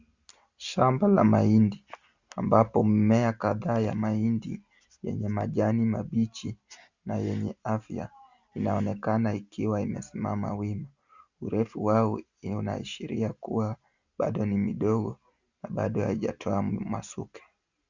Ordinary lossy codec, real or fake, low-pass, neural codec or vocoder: Opus, 64 kbps; real; 7.2 kHz; none